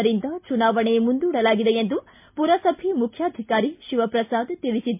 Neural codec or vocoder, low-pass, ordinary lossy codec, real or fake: none; 3.6 kHz; MP3, 32 kbps; real